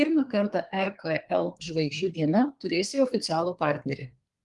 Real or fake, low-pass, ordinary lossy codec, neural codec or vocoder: fake; 10.8 kHz; Opus, 24 kbps; codec, 24 kHz, 1 kbps, SNAC